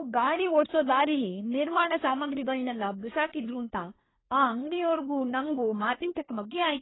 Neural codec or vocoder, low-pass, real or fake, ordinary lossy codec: codec, 24 kHz, 1 kbps, SNAC; 7.2 kHz; fake; AAC, 16 kbps